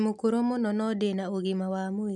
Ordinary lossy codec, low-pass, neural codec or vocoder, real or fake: none; none; none; real